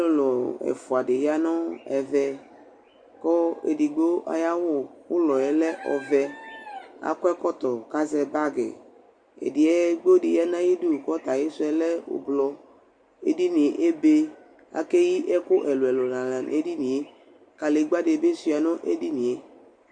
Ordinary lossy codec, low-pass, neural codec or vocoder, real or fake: Opus, 64 kbps; 9.9 kHz; none; real